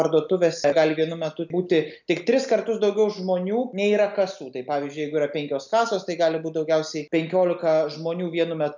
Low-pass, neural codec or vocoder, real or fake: 7.2 kHz; none; real